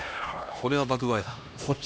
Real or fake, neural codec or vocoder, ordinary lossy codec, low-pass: fake; codec, 16 kHz, 1 kbps, X-Codec, HuBERT features, trained on LibriSpeech; none; none